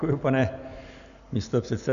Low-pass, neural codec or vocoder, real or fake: 7.2 kHz; none; real